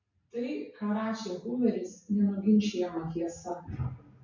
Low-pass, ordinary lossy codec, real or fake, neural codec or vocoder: 7.2 kHz; MP3, 64 kbps; real; none